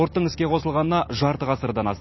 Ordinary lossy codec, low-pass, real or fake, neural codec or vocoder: MP3, 24 kbps; 7.2 kHz; real; none